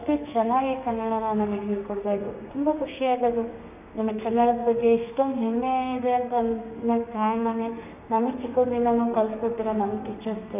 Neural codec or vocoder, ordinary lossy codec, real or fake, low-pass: codec, 32 kHz, 1.9 kbps, SNAC; none; fake; 3.6 kHz